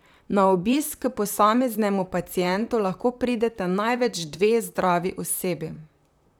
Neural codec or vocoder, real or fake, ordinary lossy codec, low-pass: vocoder, 44.1 kHz, 128 mel bands, Pupu-Vocoder; fake; none; none